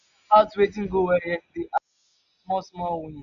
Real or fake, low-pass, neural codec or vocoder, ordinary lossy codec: real; 7.2 kHz; none; none